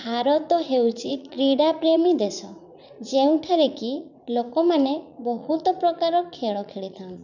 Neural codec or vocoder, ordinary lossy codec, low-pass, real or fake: vocoder, 44.1 kHz, 80 mel bands, Vocos; none; 7.2 kHz; fake